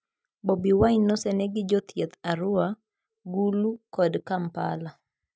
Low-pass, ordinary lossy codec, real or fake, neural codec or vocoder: none; none; real; none